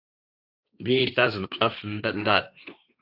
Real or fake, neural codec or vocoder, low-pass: fake; codec, 16 kHz, 1.1 kbps, Voila-Tokenizer; 5.4 kHz